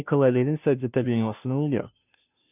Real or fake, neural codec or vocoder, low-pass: fake; codec, 16 kHz, 0.5 kbps, FunCodec, trained on Chinese and English, 25 frames a second; 3.6 kHz